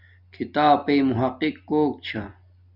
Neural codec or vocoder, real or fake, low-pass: none; real; 5.4 kHz